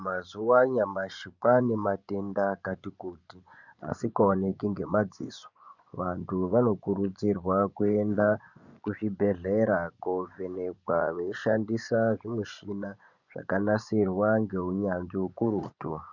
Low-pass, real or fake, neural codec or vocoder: 7.2 kHz; fake; vocoder, 24 kHz, 100 mel bands, Vocos